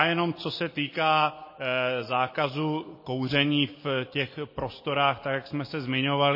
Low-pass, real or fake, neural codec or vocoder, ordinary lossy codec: 5.4 kHz; real; none; MP3, 24 kbps